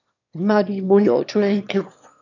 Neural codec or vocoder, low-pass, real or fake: autoencoder, 22.05 kHz, a latent of 192 numbers a frame, VITS, trained on one speaker; 7.2 kHz; fake